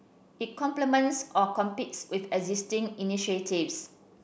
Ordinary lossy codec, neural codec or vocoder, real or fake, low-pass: none; none; real; none